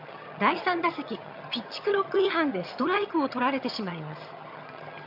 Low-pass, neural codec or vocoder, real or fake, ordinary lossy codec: 5.4 kHz; vocoder, 22.05 kHz, 80 mel bands, HiFi-GAN; fake; none